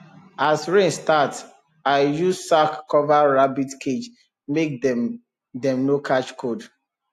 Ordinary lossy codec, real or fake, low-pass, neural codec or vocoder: AAC, 64 kbps; real; 14.4 kHz; none